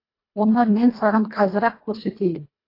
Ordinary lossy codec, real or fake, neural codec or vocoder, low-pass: AAC, 24 kbps; fake; codec, 24 kHz, 1.5 kbps, HILCodec; 5.4 kHz